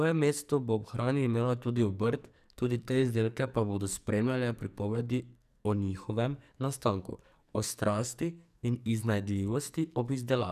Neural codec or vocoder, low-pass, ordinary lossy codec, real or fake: codec, 44.1 kHz, 2.6 kbps, SNAC; 14.4 kHz; none; fake